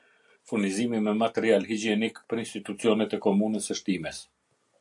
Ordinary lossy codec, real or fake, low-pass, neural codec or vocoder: AAC, 64 kbps; real; 10.8 kHz; none